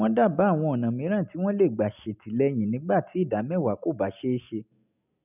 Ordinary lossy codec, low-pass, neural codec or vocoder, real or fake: none; 3.6 kHz; none; real